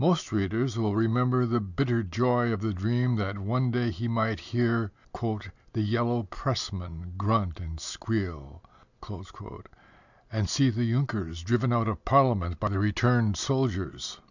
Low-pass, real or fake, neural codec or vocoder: 7.2 kHz; real; none